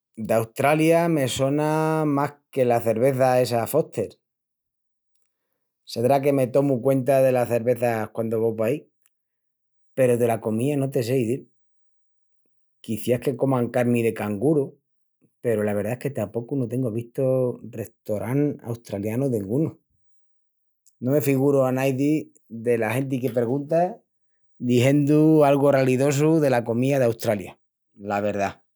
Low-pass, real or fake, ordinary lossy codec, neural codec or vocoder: none; real; none; none